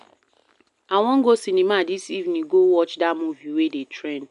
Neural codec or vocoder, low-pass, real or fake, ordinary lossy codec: none; 10.8 kHz; real; none